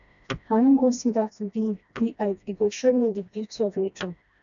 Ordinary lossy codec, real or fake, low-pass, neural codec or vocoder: none; fake; 7.2 kHz; codec, 16 kHz, 1 kbps, FreqCodec, smaller model